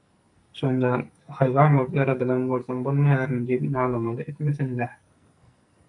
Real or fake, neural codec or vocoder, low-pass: fake; codec, 44.1 kHz, 2.6 kbps, SNAC; 10.8 kHz